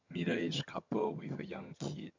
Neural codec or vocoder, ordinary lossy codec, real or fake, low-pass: vocoder, 22.05 kHz, 80 mel bands, HiFi-GAN; MP3, 64 kbps; fake; 7.2 kHz